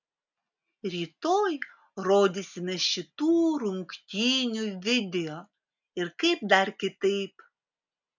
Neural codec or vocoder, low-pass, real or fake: none; 7.2 kHz; real